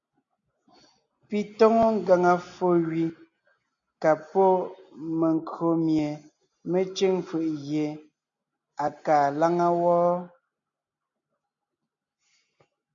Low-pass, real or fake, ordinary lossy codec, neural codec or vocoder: 7.2 kHz; real; MP3, 64 kbps; none